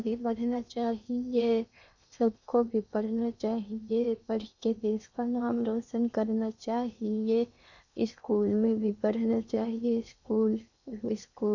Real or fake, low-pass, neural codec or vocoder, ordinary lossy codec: fake; 7.2 kHz; codec, 16 kHz in and 24 kHz out, 0.8 kbps, FocalCodec, streaming, 65536 codes; none